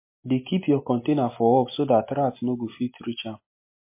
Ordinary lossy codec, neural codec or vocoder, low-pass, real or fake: MP3, 24 kbps; none; 3.6 kHz; real